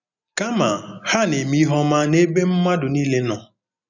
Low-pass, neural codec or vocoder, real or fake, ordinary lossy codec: 7.2 kHz; none; real; none